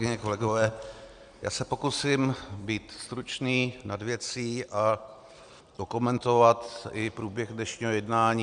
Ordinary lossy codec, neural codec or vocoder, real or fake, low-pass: Opus, 64 kbps; none; real; 9.9 kHz